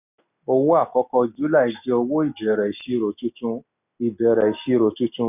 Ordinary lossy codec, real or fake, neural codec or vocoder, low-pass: none; real; none; 3.6 kHz